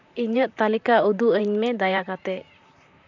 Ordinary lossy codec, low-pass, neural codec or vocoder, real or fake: none; 7.2 kHz; vocoder, 44.1 kHz, 128 mel bands, Pupu-Vocoder; fake